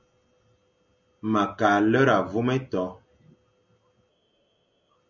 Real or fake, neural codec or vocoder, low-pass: real; none; 7.2 kHz